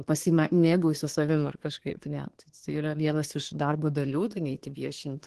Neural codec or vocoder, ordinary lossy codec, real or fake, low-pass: codec, 24 kHz, 1 kbps, SNAC; Opus, 16 kbps; fake; 10.8 kHz